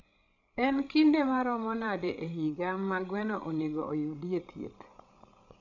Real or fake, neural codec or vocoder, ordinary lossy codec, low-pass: fake; codec, 16 kHz, 16 kbps, FreqCodec, larger model; none; 7.2 kHz